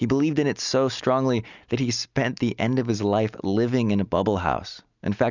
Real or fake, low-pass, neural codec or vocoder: real; 7.2 kHz; none